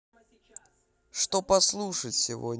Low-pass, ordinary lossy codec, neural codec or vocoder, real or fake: none; none; none; real